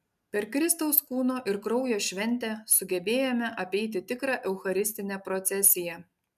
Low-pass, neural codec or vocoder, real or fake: 14.4 kHz; none; real